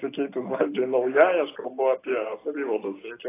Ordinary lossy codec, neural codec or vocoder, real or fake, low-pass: AAC, 16 kbps; none; real; 3.6 kHz